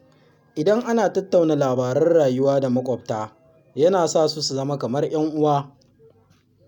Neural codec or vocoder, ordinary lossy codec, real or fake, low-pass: none; none; real; 19.8 kHz